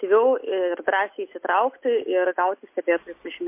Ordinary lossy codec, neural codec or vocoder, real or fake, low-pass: MP3, 24 kbps; none; real; 3.6 kHz